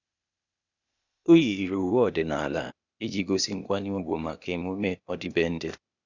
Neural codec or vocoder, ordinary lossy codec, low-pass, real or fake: codec, 16 kHz, 0.8 kbps, ZipCodec; none; 7.2 kHz; fake